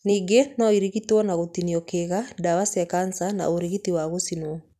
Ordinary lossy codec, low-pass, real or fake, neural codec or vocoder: none; 14.4 kHz; real; none